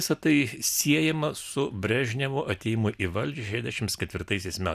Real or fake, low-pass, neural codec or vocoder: real; 14.4 kHz; none